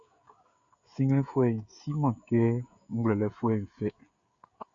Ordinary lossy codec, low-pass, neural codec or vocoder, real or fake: Opus, 64 kbps; 7.2 kHz; codec, 16 kHz, 16 kbps, FreqCodec, smaller model; fake